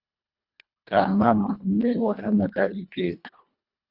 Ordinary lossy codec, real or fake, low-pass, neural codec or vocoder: Opus, 64 kbps; fake; 5.4 kHz; codec, 24 kHz, 1.5 kbps, HILCodec